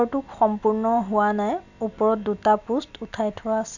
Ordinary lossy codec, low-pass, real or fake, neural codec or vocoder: none; 7.2 kHz; real; none